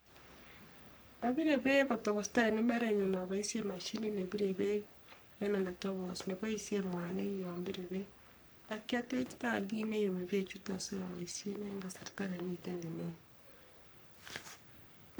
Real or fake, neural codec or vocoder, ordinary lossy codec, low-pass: fake; codec, 44.1 kHz, 3.4 kbps, Pupu-Codec; none; none